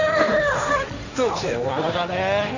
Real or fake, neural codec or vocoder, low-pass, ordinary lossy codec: fake; codec, 16 kHz, 1.1 kbps, Voila-Tokenizer; 7.2 kHz; none